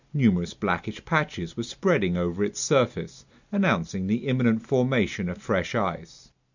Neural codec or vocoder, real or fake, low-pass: none; real; 7.2 kHz